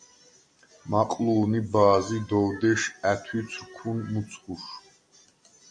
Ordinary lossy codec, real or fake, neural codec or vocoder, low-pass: MP3, 96 kbps; real; none; 9.9 kHz